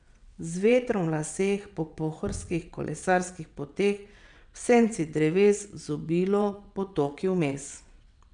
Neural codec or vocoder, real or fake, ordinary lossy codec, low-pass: vocoder, 22.05 kHz, 80 mel bands, WaveNeXt; fake; none; 9.9 kHz